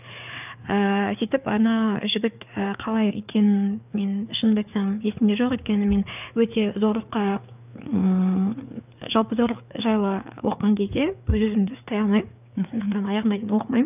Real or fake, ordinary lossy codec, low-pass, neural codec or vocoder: fake; none; 3.6 kHz; codec, 16 kHz, 4 kbps, FreqCodec, larger model